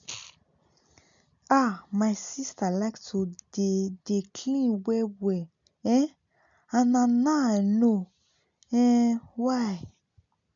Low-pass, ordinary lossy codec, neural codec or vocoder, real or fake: 7.2 kHz; none; none; real